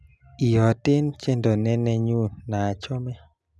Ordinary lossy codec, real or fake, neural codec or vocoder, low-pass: none; real; none; none